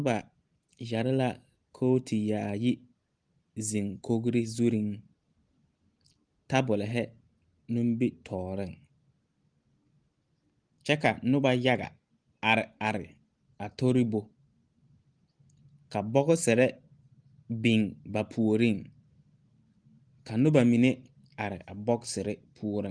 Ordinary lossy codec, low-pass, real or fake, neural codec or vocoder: Opus, 24 kbps; 9.9 kHz; real; none